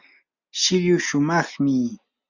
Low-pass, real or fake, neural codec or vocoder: 7.2 kHz; real; none